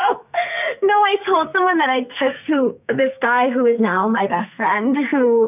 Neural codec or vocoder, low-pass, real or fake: codec, 44.1 kHz, 2.6 kbps, SNAC; 3.6 kHz; fake